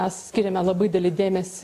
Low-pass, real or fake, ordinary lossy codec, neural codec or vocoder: 14.4 kHz; real; AAC, 64 kbps; none